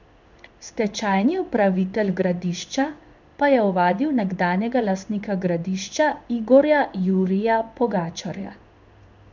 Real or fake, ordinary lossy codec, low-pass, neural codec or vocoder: fake; none; 7.2 kHz; codec, 16 kHz in and 24 kHz out, 1 kbps, XY-Tokenizer